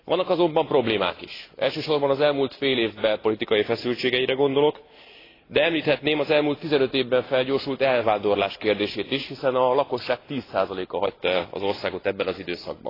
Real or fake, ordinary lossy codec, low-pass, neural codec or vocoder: real; AAC, 24 kbps; 5.4 kHz; none